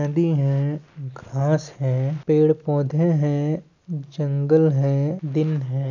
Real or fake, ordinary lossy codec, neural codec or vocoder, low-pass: real; none; none; 7.2 kHz